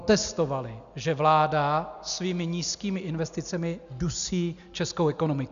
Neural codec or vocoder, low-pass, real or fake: none; 7.2 kHz; real